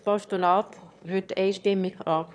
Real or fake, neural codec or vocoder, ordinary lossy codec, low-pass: fake; autoencoder, 22.05 kHz, a latent of 192 numbers a frame, VITS, trained on one speaker; none; none